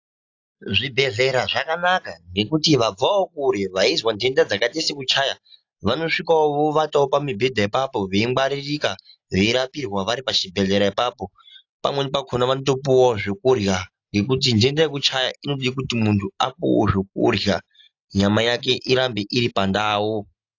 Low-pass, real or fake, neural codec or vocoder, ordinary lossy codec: 7.2 kHz; real; none; AAC, 48 kbps